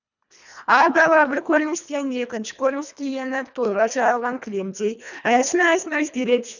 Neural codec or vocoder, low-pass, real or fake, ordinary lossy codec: codec, 24 kHz, 1.5 kbps, HILCodec; 7.2 kHz; fake; none